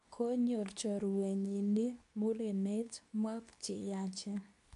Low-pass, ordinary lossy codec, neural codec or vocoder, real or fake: 10.8 kHz; none; codec, 24 kHz, 0.9 kbps, WavTokenizer, medium speech release version 2; fake